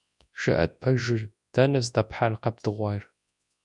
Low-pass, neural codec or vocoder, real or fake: 10.8 kHz; codec, 24 kHz, 0.9 kbps, WavTokenizer, large speech release; fake